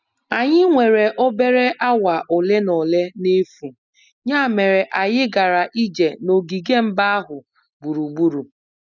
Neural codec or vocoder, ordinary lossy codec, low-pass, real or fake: none; none; 7.2 kHz; real